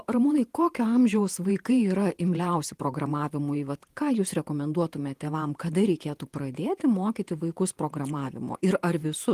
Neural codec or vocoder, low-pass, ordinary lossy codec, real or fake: vocoder, 48 kHz, 128 mel bands, Vocos; 14.4 kHz; Opus, 24 kbps; fake